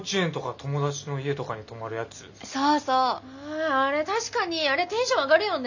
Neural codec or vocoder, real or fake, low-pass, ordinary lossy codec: none; real; 7.2 kHz; none